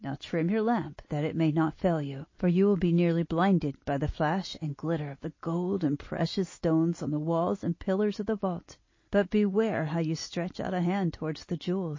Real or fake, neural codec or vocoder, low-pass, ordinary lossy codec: real; none; 7.2 kHz; MP3, 32 kbps